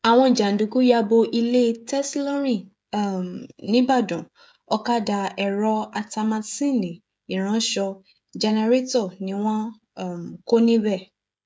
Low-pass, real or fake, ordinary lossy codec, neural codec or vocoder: none; fake; none; codec, 16 kHz, 16 kbps, FreqCodec, smaller model